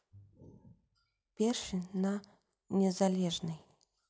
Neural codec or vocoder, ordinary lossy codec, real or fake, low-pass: none; none; real; none